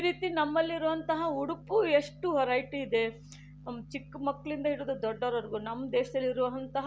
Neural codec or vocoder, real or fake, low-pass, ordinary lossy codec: none; real; none; none